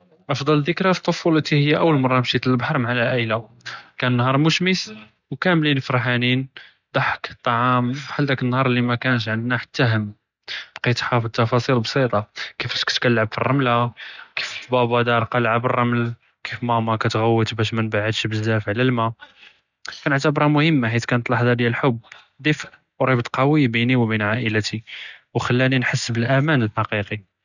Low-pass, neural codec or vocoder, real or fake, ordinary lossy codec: 7.2 kHz; none; real; none